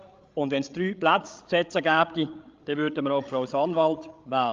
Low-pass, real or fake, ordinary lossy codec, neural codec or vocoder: 7.2 kHz; fake; Opus, 32 kbps; codec, 16 kHz, 16 kbps, FreqCodec, larger model